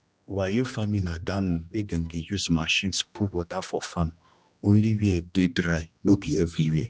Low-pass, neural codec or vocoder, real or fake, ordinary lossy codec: none; codec, 16 kHz, 1 kbps, X-Codec, HuBERT features, trained on general audio; fake; none